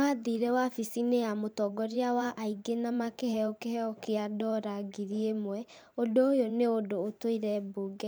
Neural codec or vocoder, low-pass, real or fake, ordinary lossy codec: vocoder, 44.1 kHz, 128 mel bands every 512 samples, BigVGAN v2; none; fake; none